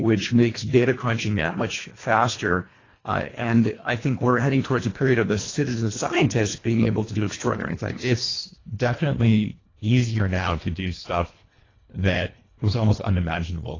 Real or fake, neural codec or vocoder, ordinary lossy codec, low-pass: fake; codec, 24 kHz, 1.5 kbps, HILCodec; AAC, 32 kbps; 7.2 kHz